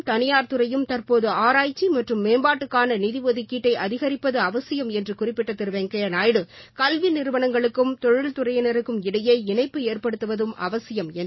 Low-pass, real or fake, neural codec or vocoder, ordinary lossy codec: 7.2 kHz; real; none; MP3, 24 kbps